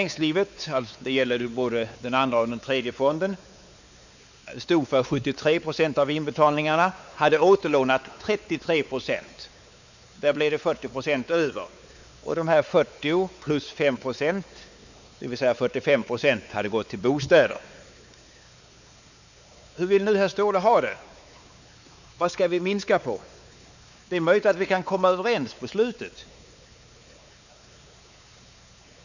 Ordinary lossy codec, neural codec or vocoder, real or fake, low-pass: none; codec, 16 kHz, 4 kbps, X-Codec, WavLM features, trained on Multilingual LibriSpeech; fake; 7.2 kHz